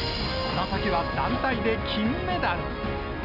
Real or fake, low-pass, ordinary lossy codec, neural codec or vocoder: real; 5.4 kHz; none; none